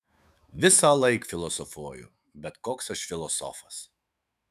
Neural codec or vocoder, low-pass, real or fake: autoencoder, 48 kHz, 128 numbers a frame, DAC-VAE, trained on Japanese speech; 14.4 kHz; fake